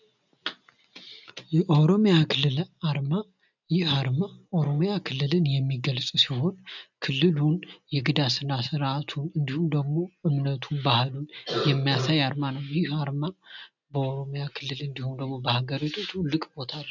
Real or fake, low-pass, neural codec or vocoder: real; 7.2 kHz; none